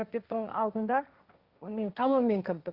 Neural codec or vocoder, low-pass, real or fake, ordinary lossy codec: codec, 16 kHz, 1.1 kbps, Voila-Tokenizer; 5.4 kHz; fake; Opus, 64 kbps